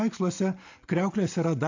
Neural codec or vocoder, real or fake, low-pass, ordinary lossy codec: none; real; 7.2 kHz; AAC, 48 kbps